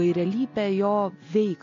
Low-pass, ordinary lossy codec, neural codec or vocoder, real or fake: 7.2 kHz; MP3, 48 kbps; none; real